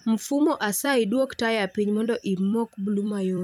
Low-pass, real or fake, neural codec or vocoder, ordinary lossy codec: none; fake; vocoder, 44.1 kHz, 128 mel bands every 256 samples, BigVGAN v2; none